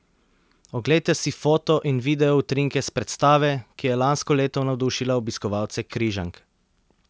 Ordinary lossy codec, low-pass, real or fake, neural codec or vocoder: none; none; real; none